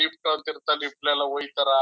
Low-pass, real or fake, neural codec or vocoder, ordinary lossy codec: none; real; none; none